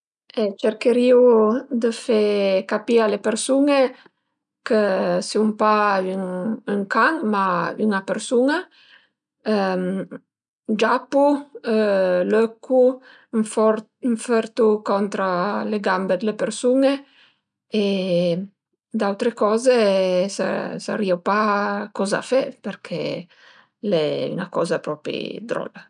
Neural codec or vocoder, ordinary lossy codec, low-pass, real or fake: none; none; 9.9 kHz; real